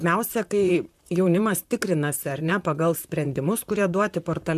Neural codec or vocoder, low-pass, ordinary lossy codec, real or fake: vocoder, 44.1 kHz, 128 mel bands, Pupu-Vocoder; 14.4 kHz; MP3, 96 kbps; fake